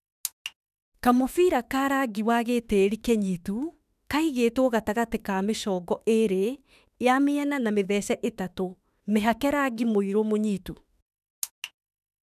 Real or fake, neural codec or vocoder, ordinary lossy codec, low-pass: fake; autoencoder, 48 kHz, 32 numbers a frame, DAC-VAE, trained on Japanese speech; none; 14.4 kHz